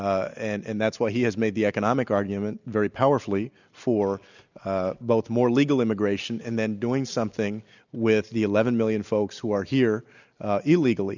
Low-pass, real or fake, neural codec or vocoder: 7.2 kHz; real; none